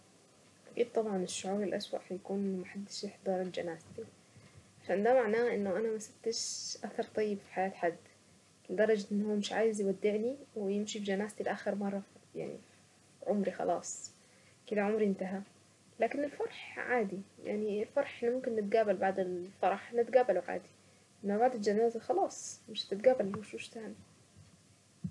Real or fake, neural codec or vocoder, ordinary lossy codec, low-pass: real; none; none; none